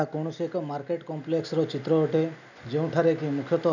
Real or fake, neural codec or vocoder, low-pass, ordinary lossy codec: real; none; 7.2 kHz; none